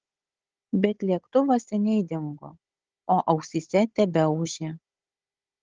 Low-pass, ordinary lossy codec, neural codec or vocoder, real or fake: 7.2 kHz; Opus, 16 kbps; codec, 16 kHz, 16 kbps, FunCodec, trained on Chinese and English, 50 frames a second; fake